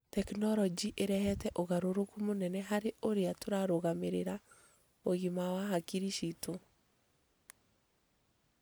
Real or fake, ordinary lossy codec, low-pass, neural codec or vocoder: real; none; none; none